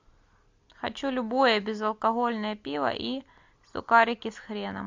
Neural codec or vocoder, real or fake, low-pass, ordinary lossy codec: none; real; 7.2 kHz; MP3, 64 kbps